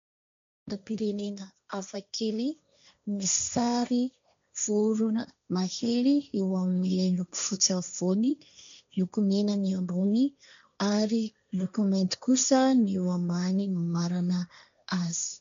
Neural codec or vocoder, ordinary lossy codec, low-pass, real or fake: codec, 16 kHz, 1.1 kbps, Voila-Tokenizer; MP3, 64 kbps; 7.2 kHz; fake